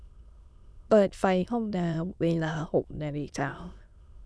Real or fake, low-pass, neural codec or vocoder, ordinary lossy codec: fake; none; autoencoder, 22.05 kHz, a latent of 192 numbers a frame, VITS, trained on many speakers; none